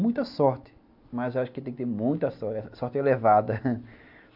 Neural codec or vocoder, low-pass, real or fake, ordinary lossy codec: none; 5.4 kHz; real; AAC, 48 kbps